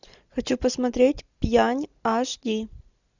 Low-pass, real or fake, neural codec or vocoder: 7.2 kHz; real; none